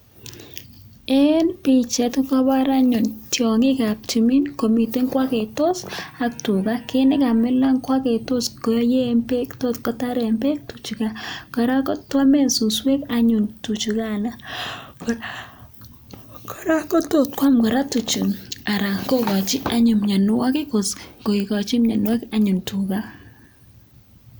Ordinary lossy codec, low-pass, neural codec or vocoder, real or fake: none; none; none; real